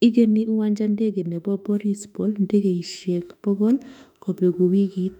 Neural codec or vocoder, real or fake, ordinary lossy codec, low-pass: autoencoder, 48 kHz, 32 numbers a frame, DAC-VAE, trained on Japanese speech; fake; none; 19.8 kHz